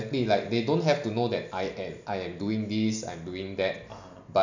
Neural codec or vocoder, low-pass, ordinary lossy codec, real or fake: none; 7.2 kHz; none; real